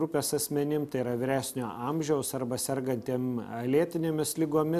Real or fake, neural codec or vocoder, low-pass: real; none; 14.4 kHz